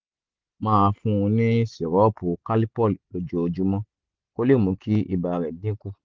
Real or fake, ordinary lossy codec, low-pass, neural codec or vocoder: real; Opus, 32 kbps; 7.2 kHz; none